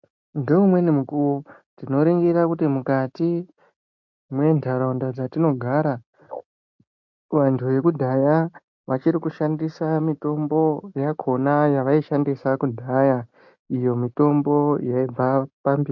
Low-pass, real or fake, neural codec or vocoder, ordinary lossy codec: 7.2 kHz; real; none; MP3, 48 kbps